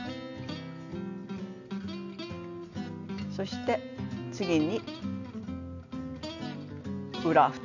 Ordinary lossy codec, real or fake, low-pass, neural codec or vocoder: none; real; 7.2 kHz; none